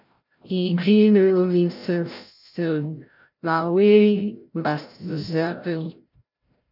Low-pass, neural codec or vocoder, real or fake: 5.4 kHz; codec, 16 kHz, 0.5 kbps, FreqCodec, larger model; fake